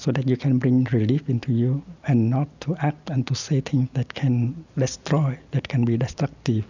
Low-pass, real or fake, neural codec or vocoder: 7.2 kHz; real; none